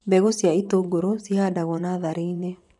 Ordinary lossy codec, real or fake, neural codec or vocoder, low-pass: none; fake; vocoder, 24 kHz, 100 mel bands, Vocos; 10.8 kHz